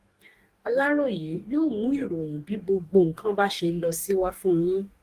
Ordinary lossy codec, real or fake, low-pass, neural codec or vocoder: Opus, 24 kbps; fake; 14.4 kHz; codec, 44.1 kHz, 2.6 kbps, DAC